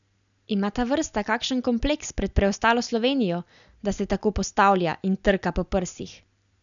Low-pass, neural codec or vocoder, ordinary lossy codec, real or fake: 7.2 kHz; none; none; real